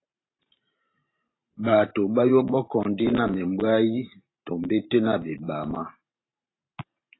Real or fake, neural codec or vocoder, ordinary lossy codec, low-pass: fake; vocoder, 44.1 kHz, 128 mel bands every 512 samples, BigVGAN v2; AAC, 16 kbps; 7.2 kHz